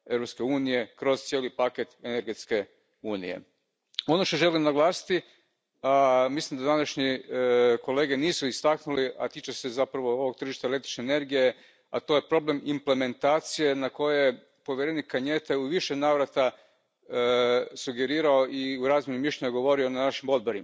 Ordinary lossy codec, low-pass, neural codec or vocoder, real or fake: none; none; none; real